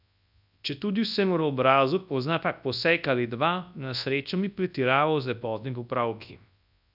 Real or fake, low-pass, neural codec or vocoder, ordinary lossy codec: fake; 5.4 kHz; codec, 24 kHz, 0.9 kbps, WavTokenizer, large speech release; none